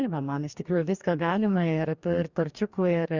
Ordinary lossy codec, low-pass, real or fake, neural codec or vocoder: Opus, 64 kbps; 7.2 kHz; fake; codec, 44.1 kHz, 2.6 kbps, DAC